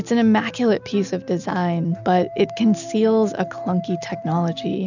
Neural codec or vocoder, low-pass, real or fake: none; 7.2 kHz; real